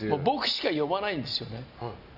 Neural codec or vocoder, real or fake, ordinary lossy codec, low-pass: none; real; none; 5.4 kHz